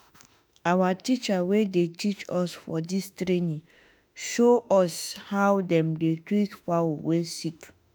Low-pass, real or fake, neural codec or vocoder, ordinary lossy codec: none; fake; autoencoder, 48 kHz, 32 numbers a frame, DAC-VAE, trained on Japanese speech; none